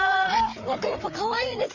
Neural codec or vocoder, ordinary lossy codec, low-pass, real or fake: codec, 16 kHz, 4 kbps, FreqCodec, larger model; none; 7.2 kHz; fake